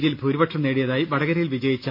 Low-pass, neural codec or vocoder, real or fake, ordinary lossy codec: 5.4 kHz; none; real; none